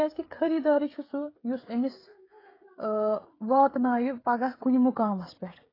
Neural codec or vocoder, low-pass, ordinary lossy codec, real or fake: codec, 16 kHz, 16 kbps, FreqCodec, smaller model; 5.4 kHz; AAC, 24 kbps; fake